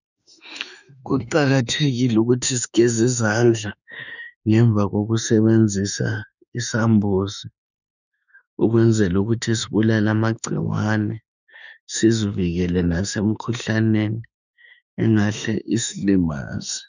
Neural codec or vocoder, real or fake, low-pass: autoencoder, 48 kHz, 32 numbers a frame, DAC-VAE, trained on Japanese speech; fake; 7.2 kHz